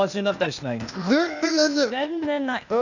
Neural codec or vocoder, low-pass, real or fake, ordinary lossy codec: codec, 16 kHz, 0.8 kbps, ZipCodec; 7.2 kHz; fake; none